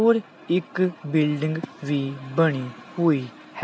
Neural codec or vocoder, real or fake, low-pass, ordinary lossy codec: none; real; none; none